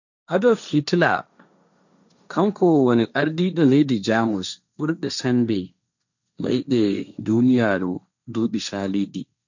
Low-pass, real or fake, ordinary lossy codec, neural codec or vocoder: 7.2 kHz; fake; none; codec, 16 kHz, 1.1 kbps, Voila-Tokenizer